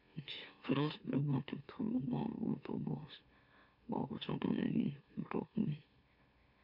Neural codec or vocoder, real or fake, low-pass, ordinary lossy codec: autoencoder, 44.1 kHz, a latent of 192 numbers a frame, MeloTTS; fake; 5.4 kHz; none